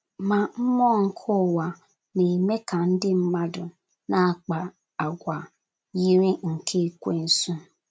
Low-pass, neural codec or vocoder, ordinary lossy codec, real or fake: none; none; none; real